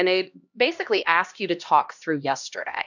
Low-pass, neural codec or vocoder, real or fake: 7.2 kHz; codec, 16 kHz, 1 kbps, X-Codec, HuBERT features, trained on LibriSpeech; fake